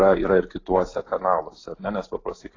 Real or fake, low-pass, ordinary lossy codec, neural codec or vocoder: real; 7.2 kHz; AAC, 32 kbps; none